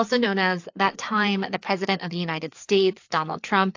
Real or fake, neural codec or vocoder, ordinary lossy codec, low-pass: fake; codec, 16 kHz, 4 kbps, FreqCodec, larger model; AAC, 48 kbps; 7.2 kHz